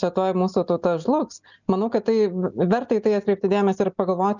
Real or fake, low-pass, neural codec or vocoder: real; 7.2 kHz; none